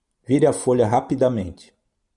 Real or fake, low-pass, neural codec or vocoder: real; 10.8 kHz; none